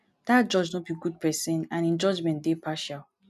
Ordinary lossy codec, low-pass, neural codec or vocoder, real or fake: none; 14.4 kHz; none; real